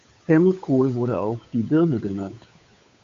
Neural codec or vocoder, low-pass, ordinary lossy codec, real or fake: codec, 16 kHz, 8 kbps, FunCodec, trained on Chinese and English, 25 frames a second; 7.2 kHz; MP3, 48 kbps; fake